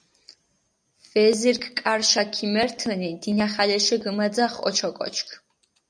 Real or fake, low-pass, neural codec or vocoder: real; 9.9 kHz; none